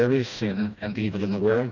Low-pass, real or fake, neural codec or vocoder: 7.2 kHz; fake; codec, 16 kHz, 1 kbps, FreqCodec, smaller model